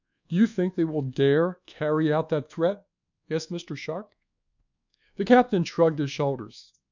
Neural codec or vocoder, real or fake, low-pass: codec, 24 kHz, 1.2 kbps, DualCodec; fake; 7.2 kHz